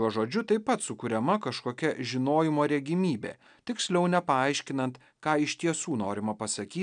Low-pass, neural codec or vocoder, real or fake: 9.9 kHz; none; real